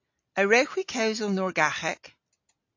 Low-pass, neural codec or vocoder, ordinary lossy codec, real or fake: 7.2 kHz; none; AAC, 48 kbps; real